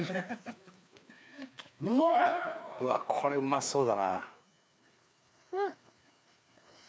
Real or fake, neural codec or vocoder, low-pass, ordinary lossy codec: fake; codec, 16 kHz, 2 kbps, FreqCodec, larger model; none; none